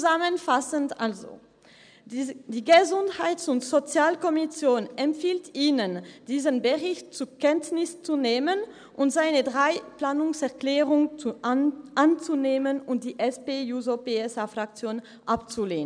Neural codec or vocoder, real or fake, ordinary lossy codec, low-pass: none; real; none; 9.9 kHz